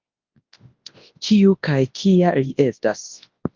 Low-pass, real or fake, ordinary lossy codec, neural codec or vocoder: 7.2 kHz; fake; Opus, 32 kbps; codec, 24 kHz, 0.9 kbps, WavTokenizer, large speech release